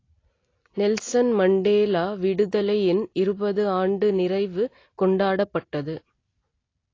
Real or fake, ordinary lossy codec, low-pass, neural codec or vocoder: real; AAC, 32 kbps; 7.2 kHz; none